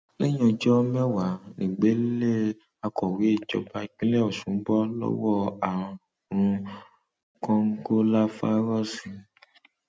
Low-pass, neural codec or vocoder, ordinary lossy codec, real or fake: none; none; none; real